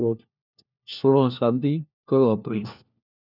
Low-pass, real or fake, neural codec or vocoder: 5.4 kHz; fake; codec, 16 kHz, 1 kbps, FunCodec, trained on LibriTTS, 50 frames a second